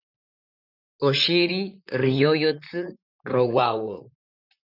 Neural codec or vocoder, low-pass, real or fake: vocoder, 44.1 kHz, 128 mel bands, Pupu-Vocoder; 5.4 kHz; fake